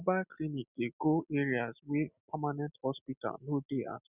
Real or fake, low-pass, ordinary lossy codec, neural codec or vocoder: real; 3.6 kHz; none; none